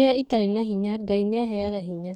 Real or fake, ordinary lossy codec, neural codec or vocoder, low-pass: fake; none; codec, 44.1 kHz, 2.6 kbps, DAC; 19.8 kHz